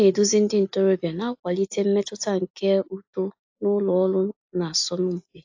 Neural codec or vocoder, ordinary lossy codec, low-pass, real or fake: none; AAC, 48 kbps; 7.2 kHz; real